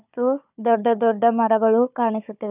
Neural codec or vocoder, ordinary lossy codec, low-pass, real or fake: codec, 16 kHz, 4 kbps, FunCodec, trained on Chinese and English, 50 frames a second; none; 3.6 kHz; fake